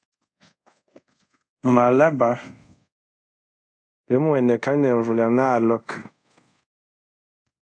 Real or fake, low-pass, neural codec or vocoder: fake; 9.9 kHz; codec, 24 kHz, 0.5 kbps, DualCodec